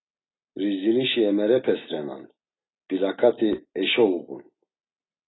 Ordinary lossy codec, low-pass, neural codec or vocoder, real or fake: AAC, 16 kbps; 7.2 kHz; none; real